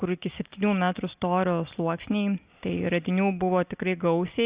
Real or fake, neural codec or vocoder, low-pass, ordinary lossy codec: real; none; 3.6 kHz; Opus, 64 kbps